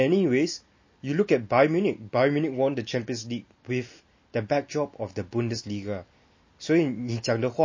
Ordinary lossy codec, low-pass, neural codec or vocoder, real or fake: MP3, 32 kbps; 7.2 kHz; none; real